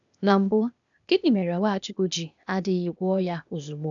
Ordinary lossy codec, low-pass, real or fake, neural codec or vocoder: none; 7.2 kHz; fake; codec, 16 kHz, 0.8 kbps, ZipCodec